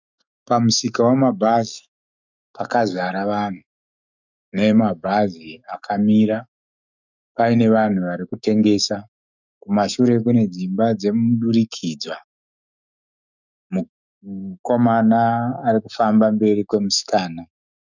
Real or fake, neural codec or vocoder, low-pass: fake; autoencoder, 48 kHz, 128 numbers a frame, DAC-VAE, trained on Japanese speech; 7.2 kHz